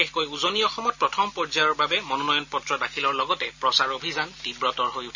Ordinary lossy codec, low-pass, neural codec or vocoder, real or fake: Opus, 64 kbps; 7.2 kHz; none; real